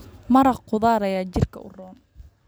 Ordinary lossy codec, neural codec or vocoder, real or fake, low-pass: none; none; real; none